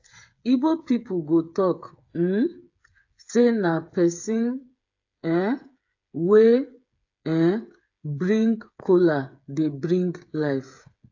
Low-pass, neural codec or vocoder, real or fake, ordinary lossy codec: 7.2 kHz; codec, 16 kHz, 8 kbps, FreqCodec, smaller model; fake; AAC, 48 kbps